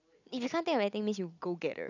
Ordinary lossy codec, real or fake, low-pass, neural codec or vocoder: none; real; 7.2 kHz; none